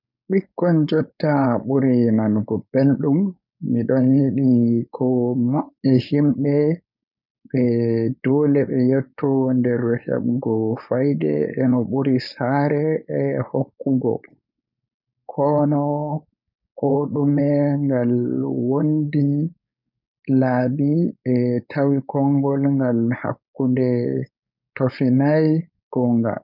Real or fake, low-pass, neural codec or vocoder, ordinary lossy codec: fake; 5.4 kHz; codec, 16 kHz, 4.8 kbps, FACodec; none